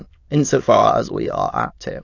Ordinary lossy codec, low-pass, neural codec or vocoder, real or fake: AAC, 48 kbps; 7.2 kHz; autoencoder, 22.05 kHz, a latent of 192 numbers a frame, VITS, trained on many speakers; fake